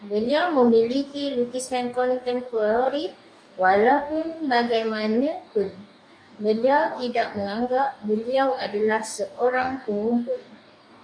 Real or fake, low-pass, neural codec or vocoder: fake; 9.9 kHz; codec, 44.1 kHz, 2.6 kbps, DAC